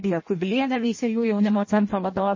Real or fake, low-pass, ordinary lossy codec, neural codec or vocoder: fake; 7.2 kHz; MP3, 32 kbps; codec, 16 kHz in and 24 kHz out, 0.6 kbps, FireRedTTS-2 codec